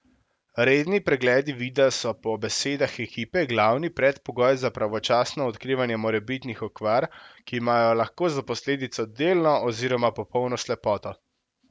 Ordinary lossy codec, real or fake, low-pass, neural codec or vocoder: none; real; none; none